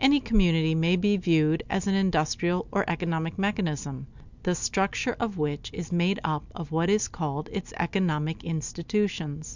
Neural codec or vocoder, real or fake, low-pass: none; real; 7.2 kHz